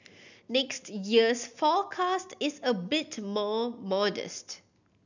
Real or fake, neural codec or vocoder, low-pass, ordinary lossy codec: real; none; 7.2 kHz; none